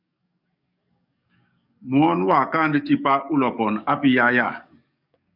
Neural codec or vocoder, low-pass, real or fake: codec, 44.1 kHz, 7.8 kbps, DAC; 5.4 kHz; fake